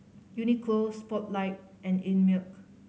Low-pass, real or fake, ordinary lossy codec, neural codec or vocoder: none; real; none; none